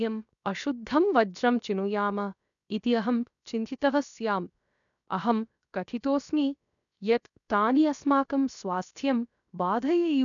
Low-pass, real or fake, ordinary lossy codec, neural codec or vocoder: 7.2 kHz; fake; none; codec, 16 kHz, 0.7 kbps, FocalCodec